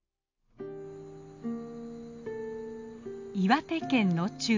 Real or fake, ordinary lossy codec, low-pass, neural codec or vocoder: real; MP3, 48 kbps; 7.2 kHz; none